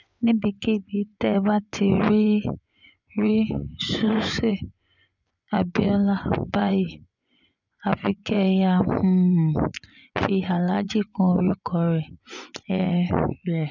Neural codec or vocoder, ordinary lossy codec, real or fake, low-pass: vocoder, 24 kHz, 100 mel bands, Vocos; none; fake; 7.2 kHz